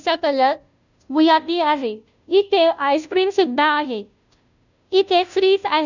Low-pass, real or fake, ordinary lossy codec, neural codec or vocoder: 7.2 kHz; fake; none; codec, 16 kHz, 0.5 kbps, FunCodec, trained on Chinese and English, 25 frames a second